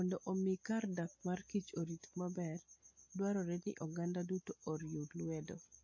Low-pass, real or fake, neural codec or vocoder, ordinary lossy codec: 7.2 kHz; real; none; MP3, 32 kbps